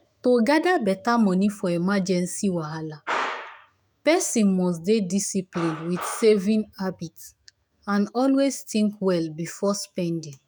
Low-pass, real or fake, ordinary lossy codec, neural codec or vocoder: none; fake; none; autoencoder, 48 kHz, 128 numbers a frame, DAC-VAE, trained on Japanese speech